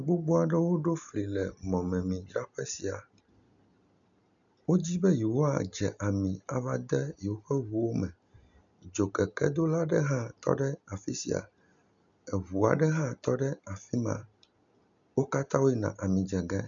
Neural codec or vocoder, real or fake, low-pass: none; real; 7.2 kHz